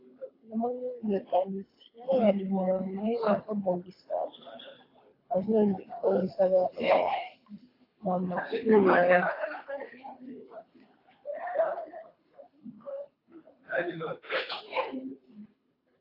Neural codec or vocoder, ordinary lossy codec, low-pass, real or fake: codec, 24 kHz, 3 kbps, HILCodec; AAC, 24 kbps; 5.4 kHz; fake